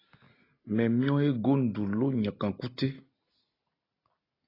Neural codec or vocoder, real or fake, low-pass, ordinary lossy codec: none; real; 5.4 kHz; AAC, 24 kbps